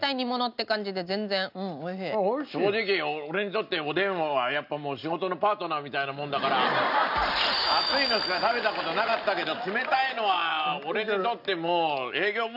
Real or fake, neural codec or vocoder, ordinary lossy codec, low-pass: real; none; none; 5.4 kHz